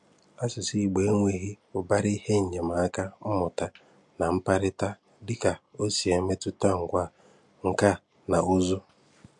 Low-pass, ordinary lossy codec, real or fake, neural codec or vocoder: 10.8 kHz; MP3, 64 kbps; real; none